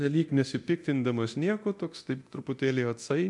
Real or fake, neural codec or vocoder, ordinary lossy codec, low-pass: fake; codec, 24 kHz, 0.9 kbps, DualCodec; MP3, 64 kbps; 10.8 kHz